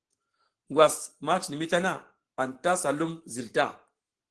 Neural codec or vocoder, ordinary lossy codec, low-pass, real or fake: codec, 44.1 kHz, 7.8 kbps, Pupu-Codec; Opus, 16 kbps; 10.8 kHz; fake